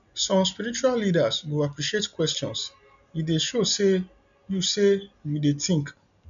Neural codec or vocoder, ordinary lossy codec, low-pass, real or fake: none; none; 7.2 kHz; real